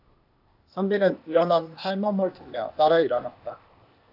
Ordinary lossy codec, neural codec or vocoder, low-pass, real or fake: AAC, 48 kbps; codec, 16 kHz, 0.8 kbps, ZipCodec; 5.4 kHz; fake